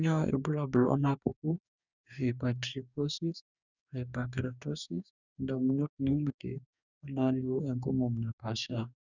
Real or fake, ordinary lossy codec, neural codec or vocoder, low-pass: fake; none; codec, 44.1 kHz, 2.6 kbps, DAC; 7.2 kHz